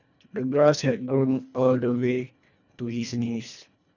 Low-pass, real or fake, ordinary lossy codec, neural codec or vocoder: 7.2 kHz; fake; none; codec, 24 kHz, 1.5 kbps, HILCodec